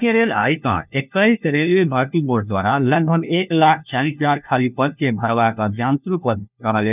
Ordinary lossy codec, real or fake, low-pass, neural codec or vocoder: none; fake; 3.6 kHz; codec, 16 kHz, 1 kbps, FunCodec, trained on LibriTTS, 50 frames a second